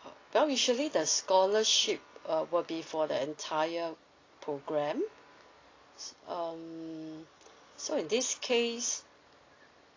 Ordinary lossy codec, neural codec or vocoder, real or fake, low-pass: AAC, 32 kbps; none; real; 7.2 kHz